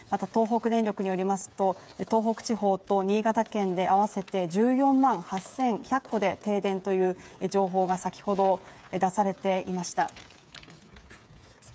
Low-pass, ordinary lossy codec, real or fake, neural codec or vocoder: none; none; fake; codec, 16 kHz, 8 kbps, FreqCodec, smaller model